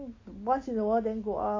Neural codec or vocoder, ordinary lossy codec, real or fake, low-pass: none; MP3, 32 kbps; real; 7.2 kHz